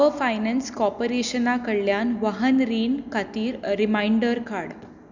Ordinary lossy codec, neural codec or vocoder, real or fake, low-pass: none; none; real; 7.2 kHz